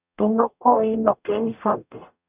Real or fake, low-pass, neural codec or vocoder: fake; 3.6 kHz; codec, 44.1 kHz, 0.9 kbps, DAC